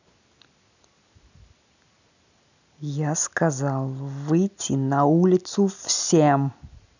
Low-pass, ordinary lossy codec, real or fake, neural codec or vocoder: 7.2 kHz; none; real; none